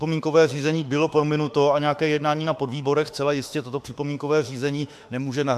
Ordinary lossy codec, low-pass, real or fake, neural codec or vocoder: AAC, 96 kbps; 14.4 kHz; fake; autoencoder, 48 kHz, 32 numbers a frame, DAC-VAE, trained on Japanese speech